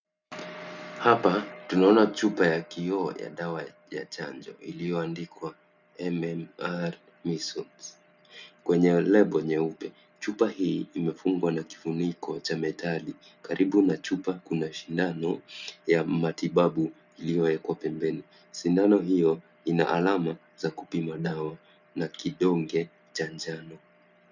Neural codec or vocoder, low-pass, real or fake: none; 7.2 kHz; real